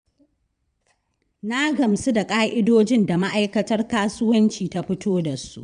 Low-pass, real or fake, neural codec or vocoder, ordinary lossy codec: 9.9 kHz; fake; vocoder, 22.05 kHz, 80 mel bands, Vocos; none